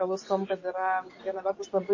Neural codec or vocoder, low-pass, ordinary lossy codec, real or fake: none; 7.2 kHz; MP3, 32 kbps; real